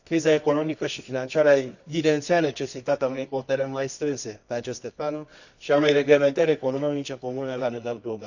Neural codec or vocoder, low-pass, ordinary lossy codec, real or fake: codec, 24 kHz, 0.9 kbps, WavTokenizer, medium music audio release; 7.2 kHz; none; fake